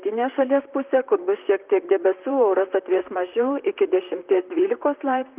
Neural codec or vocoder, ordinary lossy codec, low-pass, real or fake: vocoder, 44.1 kHz, 128 mel bands, Pupu-Vocoder; Opus, 24 kbps; 3.6 kHz; fake